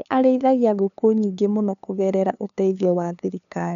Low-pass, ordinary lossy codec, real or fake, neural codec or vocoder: 7.2 kHz; none; fake; codec, 16 kHz, 2 kbps, FunCodec, trained on LibriTTS, 25 frames a second